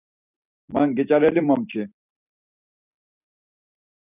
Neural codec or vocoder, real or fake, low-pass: vocoder, 44.1 kHz, 128 mel bands every 256 samples, BigVGAN v2; fake; 3.6 kHz